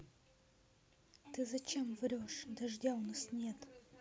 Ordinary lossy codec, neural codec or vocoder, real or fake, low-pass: none; none; real; none